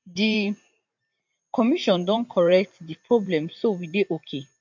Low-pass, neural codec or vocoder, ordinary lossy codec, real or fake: 7.2 kHz; vocoder, 44.1 kHz, 128 mel bands every 512 samples, BigVGAN v2; MP3, 48 kbps; fake